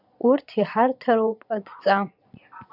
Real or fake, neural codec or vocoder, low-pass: fake; vocoder, 22.05 kHz, 80 mel bands, Vocos; 5.4 kHz